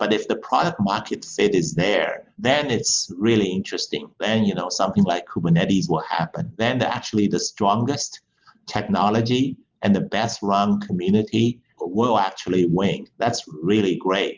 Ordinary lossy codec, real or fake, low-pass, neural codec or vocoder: Opus, 32 kbps; real; 7.2 kHz; none